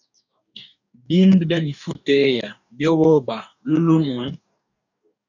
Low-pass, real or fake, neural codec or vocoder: 7.2 kHz; fake; codec, 44.1 kHz, 2.6 kbps, DAC